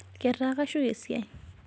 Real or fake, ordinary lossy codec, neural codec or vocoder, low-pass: real; none; none; none